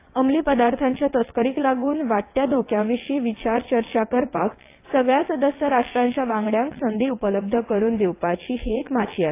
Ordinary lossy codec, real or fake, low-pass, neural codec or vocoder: AAC, 24 kbps; fake; 3.6 kHz; vocoder, 22.05 kHz, 80 mel bands, WaveNeXt